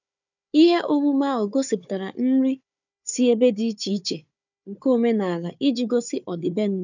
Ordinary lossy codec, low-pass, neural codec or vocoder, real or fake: none; 7.2 kHz; codec, 16 kHz, 4 kbps, FunCodec, trained on Chinese and English, 50 frames a second; fake